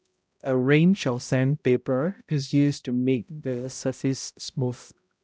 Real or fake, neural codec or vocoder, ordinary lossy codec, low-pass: fake; codec, 16 kHz, 0.5 kbps, X-Codec, HuBERT features, trained on balanced general audio; none; none